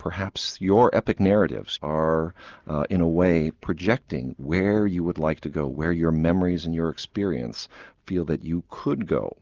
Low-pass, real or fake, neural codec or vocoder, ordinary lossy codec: 7.2 kHz; real; none; Opus, 32 kbps